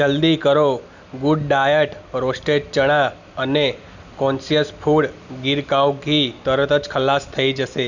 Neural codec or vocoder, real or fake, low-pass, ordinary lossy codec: none; real; 7.2 kHz; none